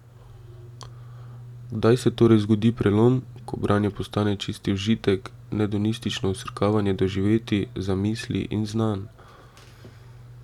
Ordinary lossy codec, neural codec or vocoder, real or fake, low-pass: none; none; real; 19.8 kHz